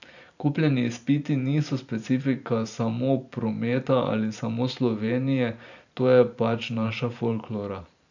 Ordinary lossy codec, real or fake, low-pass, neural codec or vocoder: none; fake; 7.2 kHz; vocoder, 44.1 kHz, 128 mel bands every 512 samples, BigVGAN v2